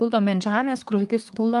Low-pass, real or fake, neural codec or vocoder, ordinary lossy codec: 10.8 kHz; fake; codec, 24 kHz, 1 kbps, SNAC; Opus, 32 kbps